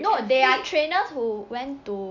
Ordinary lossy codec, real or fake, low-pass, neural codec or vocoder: none; real; 7.2 kHz; none